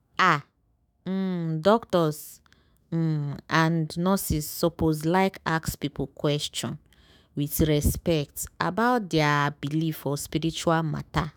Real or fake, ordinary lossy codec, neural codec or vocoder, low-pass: fake; none; autoencoder, 48 kHz, 128 numbers a frame, DAC-VAE, trained on Japanese speech; none